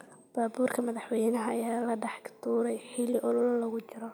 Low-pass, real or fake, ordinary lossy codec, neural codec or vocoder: none; real; none; none